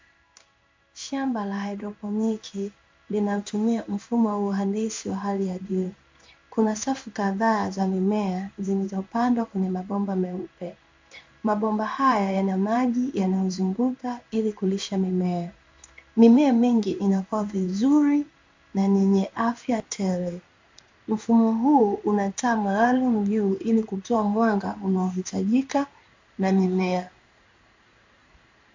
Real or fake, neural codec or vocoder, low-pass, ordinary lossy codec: fake; codec, 16 kHz in and 24 kHz out, 1 kbps, XY-Tokenizer; 7.2 kHz; MP3, 64 kbps